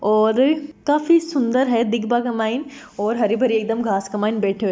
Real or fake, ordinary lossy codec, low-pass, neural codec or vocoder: real; none; none; none